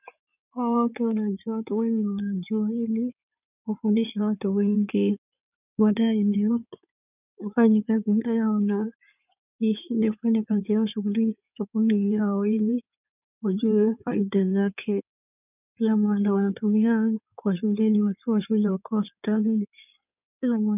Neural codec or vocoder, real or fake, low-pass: codec, 16 kHz in and 24 kHz out, 2.2 kbps, FireRedTTS-2 codec; fake; 3.6 kHz